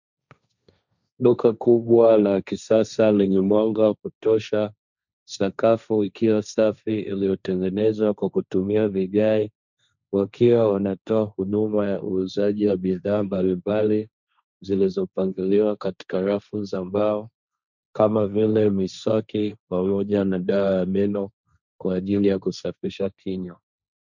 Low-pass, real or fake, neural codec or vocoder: 7.2 kHz; fake; codec, 16 kHz, 1.1 kbps, Voila-Tokenizer